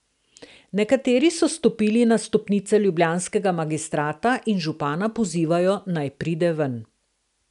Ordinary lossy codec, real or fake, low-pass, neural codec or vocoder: none; real; 10.8 kHz; none